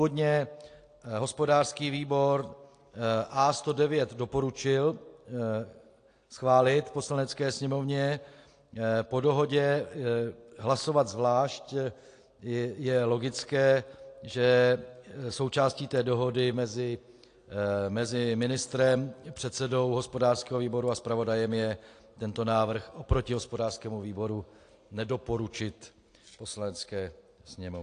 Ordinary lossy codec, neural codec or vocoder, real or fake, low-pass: AAC, 48 kbps; none; real; 9.9 kHz